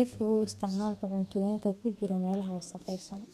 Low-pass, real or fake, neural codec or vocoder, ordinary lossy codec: 14.4 kHz; fake; codec, 32 kHz, 1.9 kbps, SNAC; none